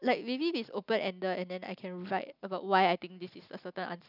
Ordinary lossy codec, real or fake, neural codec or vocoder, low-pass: none; real; none; 5.4 kHz